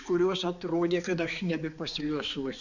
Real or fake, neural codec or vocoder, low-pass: fake; codec, 16 kHz, 4 kbps, X-Codec, HuBERT features, trained on balanced general audio; 7.2 kHz